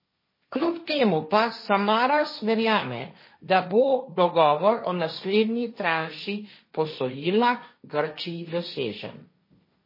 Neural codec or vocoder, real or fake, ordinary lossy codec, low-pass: codec, 16 kHz, 1.1 kbps, Voila-Tokenizer; fake; MP3, 24 kbps; 5.4 kHz